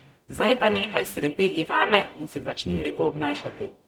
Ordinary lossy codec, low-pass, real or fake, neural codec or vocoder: none; 19.8 kHz; fake; codec, 44.1 kHz, 0.9 kbps, DAC